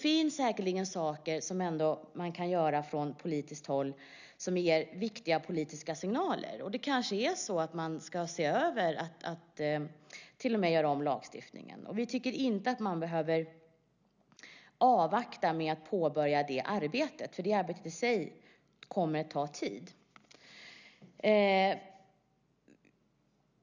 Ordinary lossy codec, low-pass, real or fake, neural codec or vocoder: none; 7.2 kHz; real; none